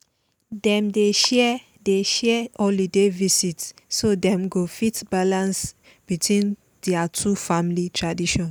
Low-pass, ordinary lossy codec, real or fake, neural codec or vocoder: none; none; real; none